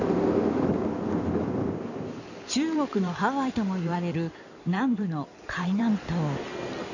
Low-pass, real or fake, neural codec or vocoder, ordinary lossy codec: 7.2 kHz; fake; codec, 16 kHz in and 24 kHz out, 2.2 kbps, FireRedTTS-2 codec; none